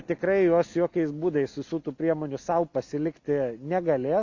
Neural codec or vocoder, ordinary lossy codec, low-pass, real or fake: none; Opus, 64 kbps; 7.2 kHz; real